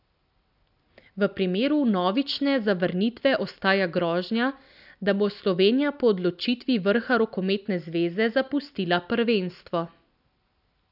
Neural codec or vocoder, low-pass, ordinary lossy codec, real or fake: none; 5.4 kHz; none; real